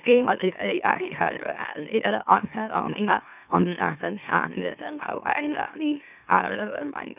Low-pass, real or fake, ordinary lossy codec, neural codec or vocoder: 3.6 kHz; fake; none; autoencoder, 44.1 kHz, a latent of 192 numbers a frame, MeloTTS